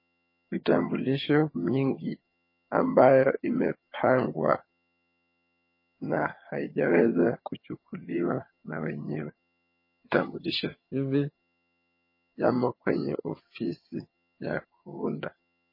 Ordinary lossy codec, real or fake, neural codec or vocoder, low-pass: MP3, 24 kbps; fake; vocoder, 22.05 kHz, 80 mel bands, HiFi-GAN; 5.4 kHz